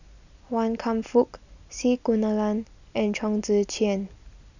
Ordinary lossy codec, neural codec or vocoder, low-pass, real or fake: none; none; 7.2 kHz; real